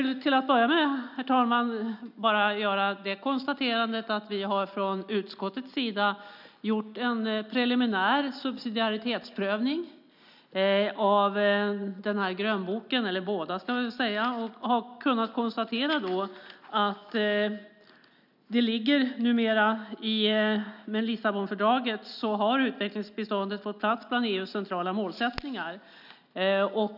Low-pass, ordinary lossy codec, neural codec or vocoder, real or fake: 5.4 kHz; none; none; real